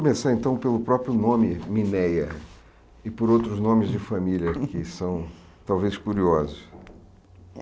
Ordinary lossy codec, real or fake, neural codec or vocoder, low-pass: none; real; none; none